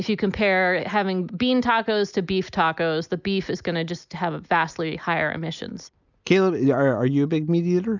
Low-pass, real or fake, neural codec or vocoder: 7.2 kHz; real; none